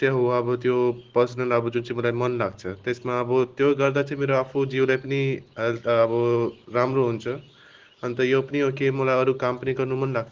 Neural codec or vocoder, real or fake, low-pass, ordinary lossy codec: none; real; 7.2 kHz; Opus, 16 kbps